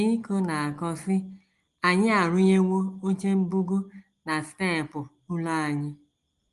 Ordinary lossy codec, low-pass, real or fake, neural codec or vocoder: Opus, 24 kbps; 10.8 kHz; real; none